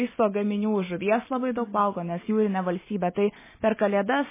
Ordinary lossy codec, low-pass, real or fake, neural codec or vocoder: MP3, 16 kbps; 3.6 kHz; real; none